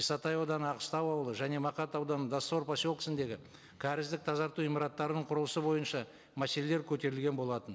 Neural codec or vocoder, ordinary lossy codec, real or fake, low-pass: none; none; real; none